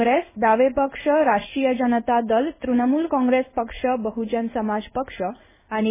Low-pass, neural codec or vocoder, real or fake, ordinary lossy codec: 3.6 kHz; codec, 16 kHz in and 24 kHz out, 1 kbps, XY-Tokenizer; fake; MP3, 16 kbps